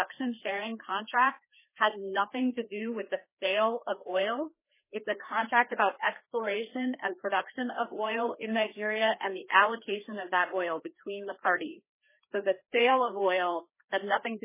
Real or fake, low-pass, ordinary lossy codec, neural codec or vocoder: fake; 3.6 kHz; MP3, 16 kbps; codec, 16 kHz, 2 kbps, FreqCodec, larger model